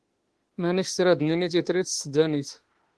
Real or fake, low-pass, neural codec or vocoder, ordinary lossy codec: fake; 10.8 kHz; autoencoder, 48 kHz, 32 numbers a frame, DAC-VAE, trained on Japanese speech; Opus, 16 kbps